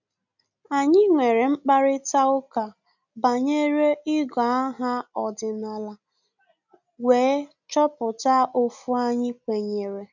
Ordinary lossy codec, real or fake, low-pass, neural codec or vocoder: none; real; 7.2 kHz; none